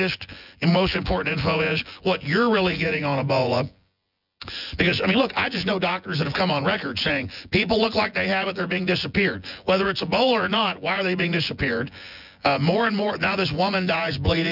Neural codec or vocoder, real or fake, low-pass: vocoder, 24 kHz, 100 mel bands, Vocos; fake; 5.4 kHz